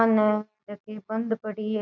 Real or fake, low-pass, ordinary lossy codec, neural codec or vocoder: real; 7.2 kHz; none; none